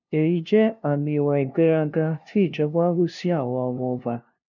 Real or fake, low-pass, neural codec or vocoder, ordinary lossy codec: fake; 7.2 kHz; codec, 16 kHz, 0.5 kbps, FunCodec, trained on LibriTTS, 25 frames a second; none